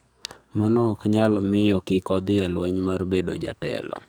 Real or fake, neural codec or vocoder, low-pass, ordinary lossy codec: fake; codec, 44.1 kHz, 2.6 kbps, SNAC; none; none